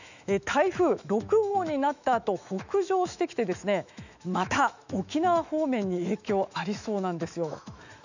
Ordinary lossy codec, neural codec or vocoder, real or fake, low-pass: none; autoencoder, 48 kHz, 128 numbers a frame, DAC-VAE, trained on Japanese speech; fake; 7.2 kHz